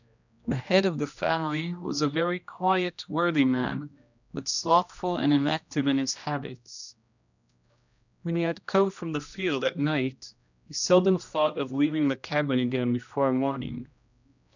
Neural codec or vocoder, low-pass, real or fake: codec, 16 kHz, 1 kbps, X-Codec, HuBERT features, trained on general audio; 7.2 kHz; fake